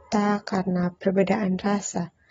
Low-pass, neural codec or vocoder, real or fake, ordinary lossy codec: 7.2 kHz; none; real; AAC, 24 kbps